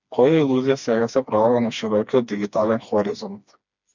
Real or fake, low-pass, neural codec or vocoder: fake; 7.2 kHz; codec, 16 kHz, 2 kbps, FreqCodec, smaller model